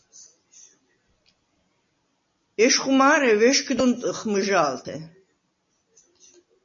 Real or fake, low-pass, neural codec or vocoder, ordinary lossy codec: real; 7.2 kHz; none; MP3, 32 kbps